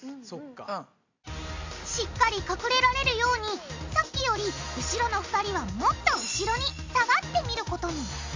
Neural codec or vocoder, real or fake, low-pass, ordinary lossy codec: none; real; 7.2 kHz; AAC, 48 kbps